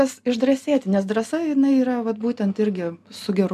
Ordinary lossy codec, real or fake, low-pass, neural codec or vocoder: MP3, 96 kbps; real; 14.4 kHz; none